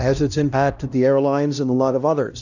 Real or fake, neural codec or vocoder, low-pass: fake; codec, 16 kHz, 1 kbps, X-Codec, HuBERT features, trained on LibriSpeech; 7.2 kHz